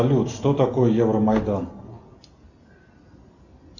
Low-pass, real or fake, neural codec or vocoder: 7.2 kHz; real; none